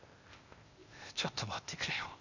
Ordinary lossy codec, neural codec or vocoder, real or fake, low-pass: none; codec, 16 kHz, 0.8 kbps, ZipCodec; fake; 7.2 kHz